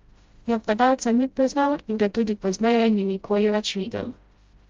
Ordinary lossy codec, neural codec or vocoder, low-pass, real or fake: Opus, 32 kbps; codec, 16 kHz, 0.5 kbps, FreqCodec, smaller model; 7.2 kHz; fake